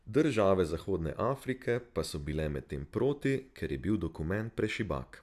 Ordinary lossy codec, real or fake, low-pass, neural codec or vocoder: none; real; 14.4 kHz; none